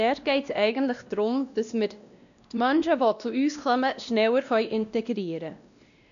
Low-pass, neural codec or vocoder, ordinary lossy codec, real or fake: 7.2 kHz; codec, 16 kHz, 1 kbps, X-Codec, WavLM features, trained on Multilingual LibriSpeech; none; fake